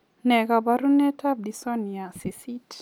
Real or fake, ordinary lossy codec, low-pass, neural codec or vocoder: real; none; 19.8 kHz; none